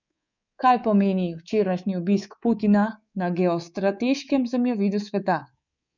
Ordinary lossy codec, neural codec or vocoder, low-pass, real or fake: none; codec, 24 kHz, 3.1 kbps, DualCodec; 7.2 kHz; fake